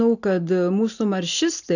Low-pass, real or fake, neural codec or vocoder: 7.2 kHz; real; none